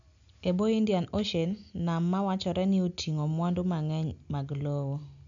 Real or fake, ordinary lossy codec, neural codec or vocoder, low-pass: real; none; none; 7.2 kHz